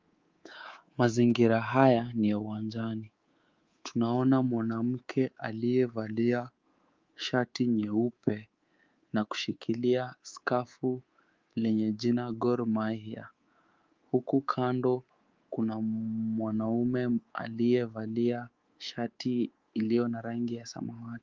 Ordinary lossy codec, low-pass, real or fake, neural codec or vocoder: Opus, 32 kbps; 7.2 kHz; fake; codec, 24 kHz, 3.1 kbps, DualCodec